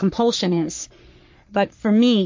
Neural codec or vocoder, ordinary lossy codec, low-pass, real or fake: codec, 44.1 kHz, 3.4 kbps, Pupu-Codec; MP3, 48 kbps; 7.2 kHz; fake